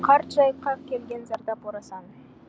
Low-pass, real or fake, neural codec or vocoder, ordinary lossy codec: none; real; none; none